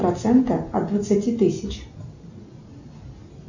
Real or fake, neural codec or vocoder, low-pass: real; none; 7.2 kHz